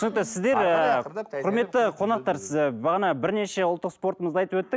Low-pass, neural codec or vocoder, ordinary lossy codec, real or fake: none; none; none; real